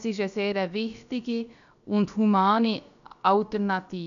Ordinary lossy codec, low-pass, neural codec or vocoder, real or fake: none; 7.2 kHz; codec, 16 kHz, about 1 kbps, DyCAST, with the encoder's durations; fake